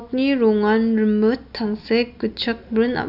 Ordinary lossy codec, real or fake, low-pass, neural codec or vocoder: none; real; 5.4 kHz; none